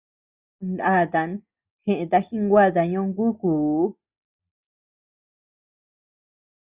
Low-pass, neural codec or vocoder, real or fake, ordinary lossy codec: 3.6 kHz; none; real; Opus, 64 kbps